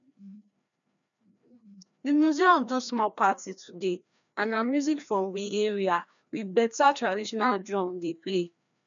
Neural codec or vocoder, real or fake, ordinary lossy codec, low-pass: codec, 16 kHz, 1 kbps, FreqCodec, larger model; fake; none; 7.2 kHz